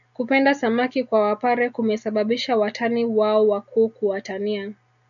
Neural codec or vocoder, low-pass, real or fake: none; 7.2 kHz; real